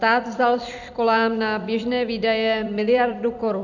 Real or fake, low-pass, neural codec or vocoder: fake; 7.2 kHz; vocoder, 44.1 kHz, 128 mel bands every 256 samples, BigVGAN v2